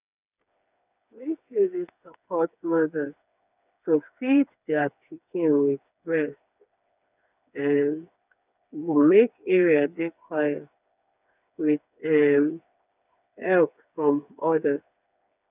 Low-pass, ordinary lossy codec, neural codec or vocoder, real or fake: 3.6 kHz; none; codec, 16 kHz, 4 kbps, FreqCodec, smaller model; fake